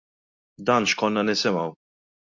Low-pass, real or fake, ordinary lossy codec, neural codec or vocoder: 7.2 kHz; real; MP3, 48 kbps; none